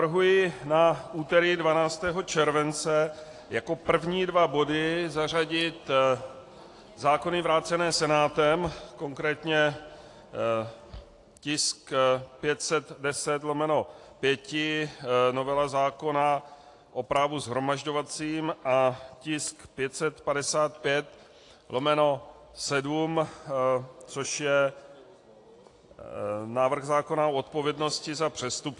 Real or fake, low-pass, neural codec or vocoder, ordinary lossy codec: real; 10.8 kHz; none; AAC, 48 kbps